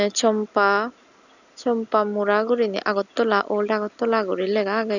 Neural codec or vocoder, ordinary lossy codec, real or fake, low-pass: none; none; real; 7.2 kHz